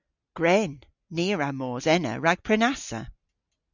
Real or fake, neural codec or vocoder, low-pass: real; none; 7.2 kHz